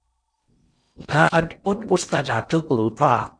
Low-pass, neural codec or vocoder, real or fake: 9.9 kHz; codec, 16 kHz in and 24 kHz out, 0.8 kbps, FocalCodec, streaming, 65536 codes; fake